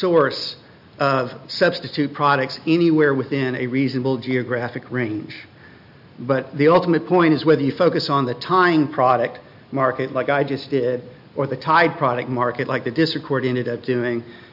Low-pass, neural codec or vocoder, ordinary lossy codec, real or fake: 5.4 kHz; none; AAC, 48 kbps; real